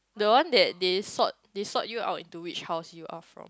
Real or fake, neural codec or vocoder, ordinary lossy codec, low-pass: real; none; none; none